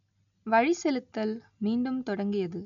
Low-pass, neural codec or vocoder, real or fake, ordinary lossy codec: 7.2 kHz; none; real; none